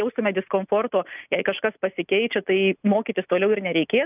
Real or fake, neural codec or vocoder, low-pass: real; none; 3.6 kHz